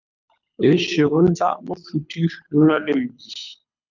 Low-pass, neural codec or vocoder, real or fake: 7.2 kHz; codec, 24 kHz, 6 kbps, HILCodec; fake